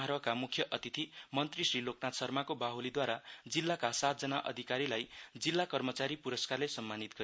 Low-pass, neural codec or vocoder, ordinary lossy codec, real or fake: none; none; none; real